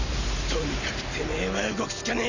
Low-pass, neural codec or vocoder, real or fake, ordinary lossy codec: 7.2 kHz; none; real; none